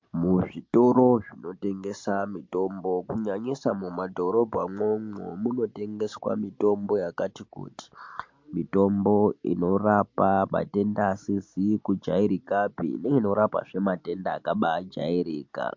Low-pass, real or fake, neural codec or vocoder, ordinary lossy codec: 7.2 kHz; real; none; MP3, 48 kbps